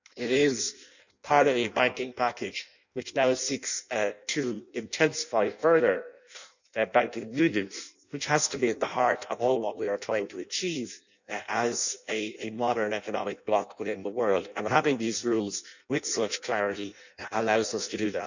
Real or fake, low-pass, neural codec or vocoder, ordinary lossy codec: fake; 7.2 kHz; codec, 16 kHz in and 24 kHz out, 0.6 kbps, FireRedTTS-2 codec; none